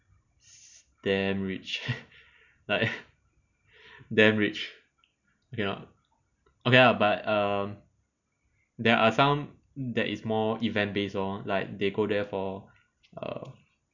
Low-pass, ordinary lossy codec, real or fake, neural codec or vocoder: 7.2 kHz; none; real; none